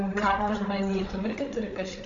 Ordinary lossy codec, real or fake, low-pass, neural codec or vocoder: AAC, 64 kbps; fake; 7.2 kHz; codec, 16 kHz, 8 kbps, FreqCodec, larger model